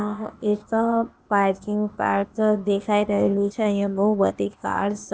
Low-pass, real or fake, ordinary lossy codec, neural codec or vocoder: none; fake; none; codec, 16 kHz, 0.8 kbps, ZipCodec